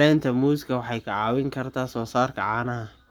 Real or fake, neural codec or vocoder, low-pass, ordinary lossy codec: fake; codec, 44.1 kHz, 7.8 kbps, Pupu-Codec; none; none